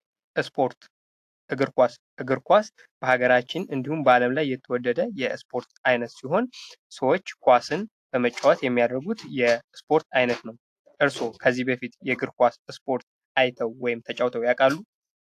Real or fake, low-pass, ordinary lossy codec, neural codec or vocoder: real; 14.4 kHz; AAC, 64 kbps; none